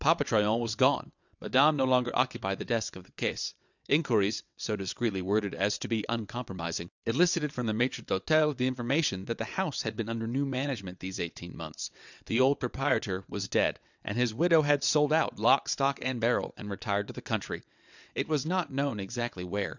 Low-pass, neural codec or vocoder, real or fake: 7.2 kHz; vocoder, 22.05 kHz, 80 mel bands, WaveNeXt; fake